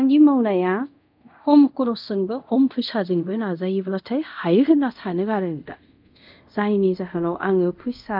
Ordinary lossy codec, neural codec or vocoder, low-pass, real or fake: none; codec, 24 kHz, 0.5 kbps, DualCodec; 5.4 kHz; fake